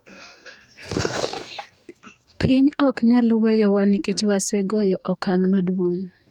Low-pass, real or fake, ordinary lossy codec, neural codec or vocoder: 19.8 kHz; fake; none; codec, 44.1 kHz, 2.6 kbps, DAC